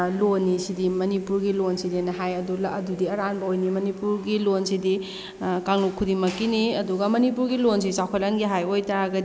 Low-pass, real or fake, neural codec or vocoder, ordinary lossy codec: none; real; none; none